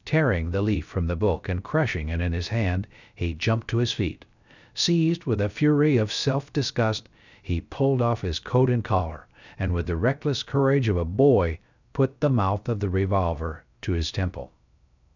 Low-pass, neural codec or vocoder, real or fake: 7.2 kHz; codec, 16 kHz, 0.3 kbps, FocalCodec; fake